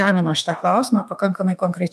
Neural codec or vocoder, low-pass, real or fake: autoencoder, 48 kHz, 32 numbers a frame, DAC-VAE, trained on Japanese speech; 14.4 kHz; fake